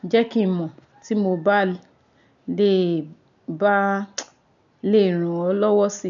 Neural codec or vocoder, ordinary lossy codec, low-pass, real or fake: none; none; 7.2 kHz; real